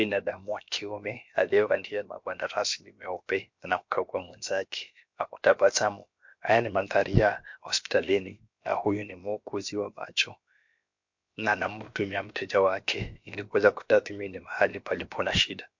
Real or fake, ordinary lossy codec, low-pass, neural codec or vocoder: fake; MP3, 48 kbps; 7.2 kHz; codec, 16 kHz, about 1 kbps, DyCAST, with the encoder's durations